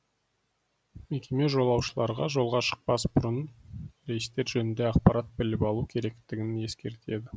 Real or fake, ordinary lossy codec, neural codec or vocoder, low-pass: real; none; none; none